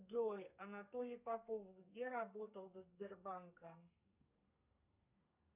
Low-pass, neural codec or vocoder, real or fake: 3.6 kHz; codec, 32 kHz, 1.9 kbps, SNAC; fake